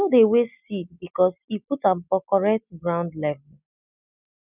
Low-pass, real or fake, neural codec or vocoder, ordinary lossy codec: 3.6 kHz; real; none; none